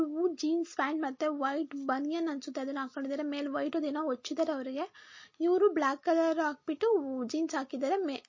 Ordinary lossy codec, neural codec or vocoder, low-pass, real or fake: MP3, 32 kbps; none; 7.2 kHz; real